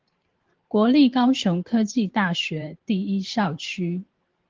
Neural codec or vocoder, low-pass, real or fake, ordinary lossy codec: vocoder, 44.1 kHz, 128 mel bands every 512 samples, BigVGAN v2; 7.2 kHz; fake; Opus, 16 kbps